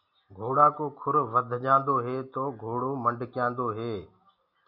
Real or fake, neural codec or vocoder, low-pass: real; none; 5.4 kHz